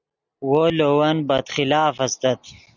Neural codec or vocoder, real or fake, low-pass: none; real; 7.2 kHz